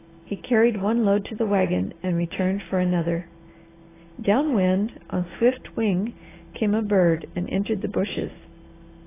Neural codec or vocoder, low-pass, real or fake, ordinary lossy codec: none; 3.6 kHz; real; AAC, 16 kbps